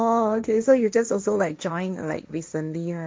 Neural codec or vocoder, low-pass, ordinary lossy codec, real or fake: codec, 16 kHz, 1.1 kbps, Voila-Tokenizer; none; none; fake